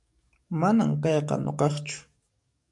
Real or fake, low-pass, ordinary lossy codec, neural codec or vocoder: fake; 10.8 kHz; MP3, 96 kbps; codec, 44.1 kHz, 7.8 kbps, DAC